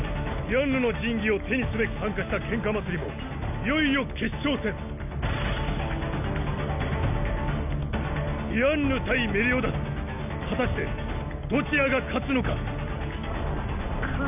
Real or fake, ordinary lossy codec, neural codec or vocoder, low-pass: real; none; none; 3.6 kHz